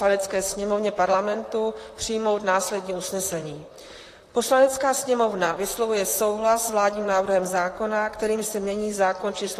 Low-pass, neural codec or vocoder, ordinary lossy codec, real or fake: 14.4 kHz; vocoder, 44.1 kHz, 128 mel bands, Pupu-Vocoder; AAC, 48 kbps; fake